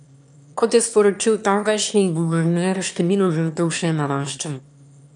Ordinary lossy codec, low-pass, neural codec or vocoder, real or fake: none; 9.9 kHz; autoencoder, 22.05 kHz, a latent of 192 numbers a frame, VITS, trained on one speaker; fake